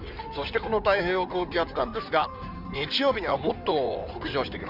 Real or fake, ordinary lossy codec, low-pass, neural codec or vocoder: fake; none; 5.4 kHz; codec, 16 kHz in and 24 kHz out, 2.2 kbps, FireRedTTS-2 codec